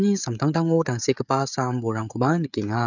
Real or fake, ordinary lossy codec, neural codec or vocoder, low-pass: fake; none; codec, 16 kHz, 16 kbps, FreqCodec, smaller model; 7.2 kHz